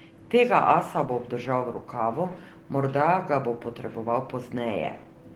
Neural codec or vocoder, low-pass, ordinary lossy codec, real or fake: none; 19.8 kHz; Opus, 16 kbps; real